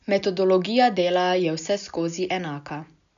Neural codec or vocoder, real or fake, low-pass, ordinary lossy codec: none; real; 7.2 kHz; none